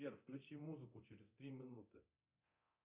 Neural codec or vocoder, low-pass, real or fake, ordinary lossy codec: codec, 24 kHz, 0.9 kbps, DualCodec; 3.6 kHz; fake; Opus, 64 kbps